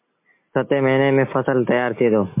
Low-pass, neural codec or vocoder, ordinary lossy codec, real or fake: 3.6 kHz; none; MP3, 24 kbps; real